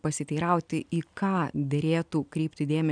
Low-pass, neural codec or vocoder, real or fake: 9.9 kHz; none; real